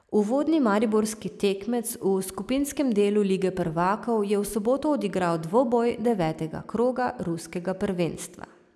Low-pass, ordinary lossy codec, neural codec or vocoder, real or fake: none; none; none; real